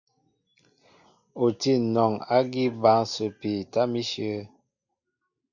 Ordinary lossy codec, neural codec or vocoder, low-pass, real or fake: Opus, 64 kbps; none; 7.2 kHz; real